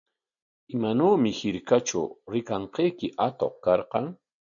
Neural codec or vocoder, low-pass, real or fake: none; 7.2 kHz; real